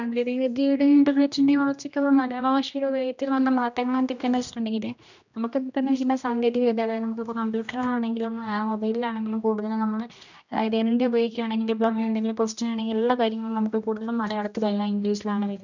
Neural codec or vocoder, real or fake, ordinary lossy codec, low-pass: codec, 16 kHz, 1 kbps, X-Codec, HuBERT features, trained on general audio; fake; none; 7.2 kHz